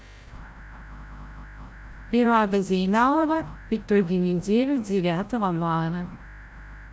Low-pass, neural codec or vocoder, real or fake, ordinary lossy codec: none; codec, 16 kHz, 0.5 kbps, FreqCodec, larger model; fake; none